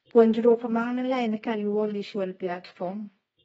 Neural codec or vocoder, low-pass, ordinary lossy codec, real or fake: codec, 24 kHz, 0.9 kbps, WavTokenizer, medium music audio release; 10.8 kHz; AAC, 24 kbps; fake